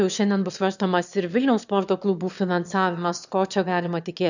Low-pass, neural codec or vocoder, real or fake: 7.2 kHz; autoencoder, 22.05 kHz, a latent of 192 numbers a frame, VITS, trained on one speaker; fake